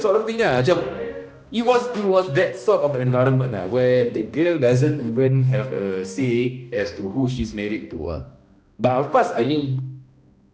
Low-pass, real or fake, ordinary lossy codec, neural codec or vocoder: none; fake; none; codec, 16 kHz, 1 kbps, X-Codec, HuBERT features, trained on balanced general audio